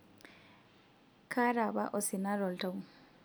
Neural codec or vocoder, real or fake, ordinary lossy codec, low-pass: none; real; none; none